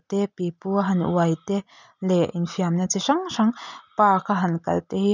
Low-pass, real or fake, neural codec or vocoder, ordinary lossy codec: 7.2 kHz; real; none; none